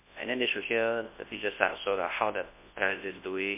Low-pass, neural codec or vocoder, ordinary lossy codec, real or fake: 3.6 kHz; codec, 24 kHz, 0.9 kbps, WavTokenizer, large speech release; MP3, 24 kbps; fake